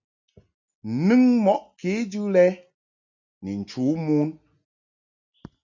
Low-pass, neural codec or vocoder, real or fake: 7.2 kHz; none; real